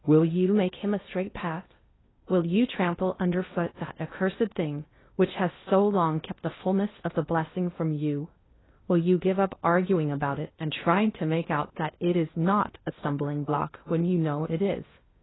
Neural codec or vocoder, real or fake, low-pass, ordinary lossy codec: codec, 16 kHz in and 24 kHz out, 0.8 kbps, FocalCodec, streaming, 65536 codes; fake; 7.2 kHz; AAC, 16 kbps